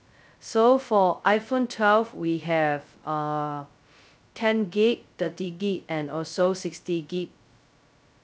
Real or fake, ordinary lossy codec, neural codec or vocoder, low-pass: fake; none; codec, 16 kHz, 0.2 kbps, FocalCodec; none